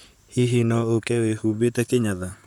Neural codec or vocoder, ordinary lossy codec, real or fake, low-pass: vocoder, 44.1 kHz, 128 mel bands, Pupu-Vocoder; none; fake; 19.8 kHz